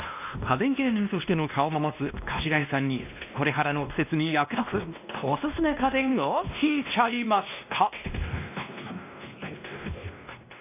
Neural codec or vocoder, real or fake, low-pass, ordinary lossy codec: codec, 16 kHz, 1 kbps, X-Codec, WavLM features, trained on Multilingual LibriSpeech; fake; 3.6 kHz; none